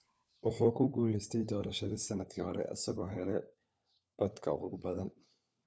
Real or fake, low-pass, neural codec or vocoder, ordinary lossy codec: fake; none; codec, 16 kHz, 4 kbps, FunCodec, trained on LibriTTS, 50 frames a second; none